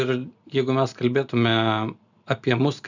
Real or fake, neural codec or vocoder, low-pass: real; none; 7.2 kHz